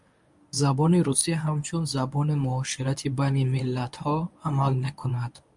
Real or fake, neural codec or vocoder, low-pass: fake; codec, 24 kHz, 0.9 kbps, WavTokenizer, medium speech release version 1; 10.8 kHz